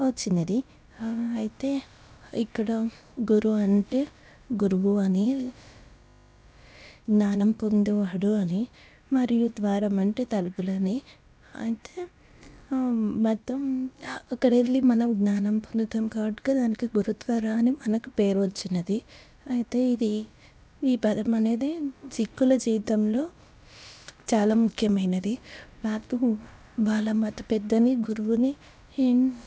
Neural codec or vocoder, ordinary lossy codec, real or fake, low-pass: codec, 16 kHz, about 1 kbps, DyCAST, with the encoder's durations; none; fake; none